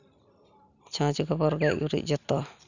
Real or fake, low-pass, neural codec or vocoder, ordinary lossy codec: real; 7.2 kHz; none; none